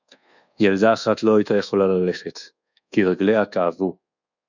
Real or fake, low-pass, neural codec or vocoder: fake; 7.2 kHz; codec, 24 kHz, 1.2 kbps, DualCodec